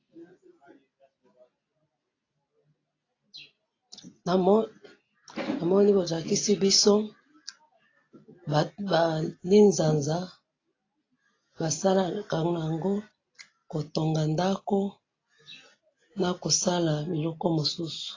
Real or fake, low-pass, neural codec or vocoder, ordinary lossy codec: real; 7.2 kHz; none; AAC, 32 kbps